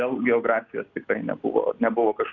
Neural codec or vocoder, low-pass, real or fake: none; 7.2 kHz; real